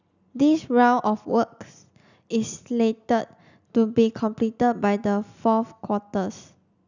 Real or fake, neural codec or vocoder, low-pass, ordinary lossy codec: real; none; 7.2 kHz; none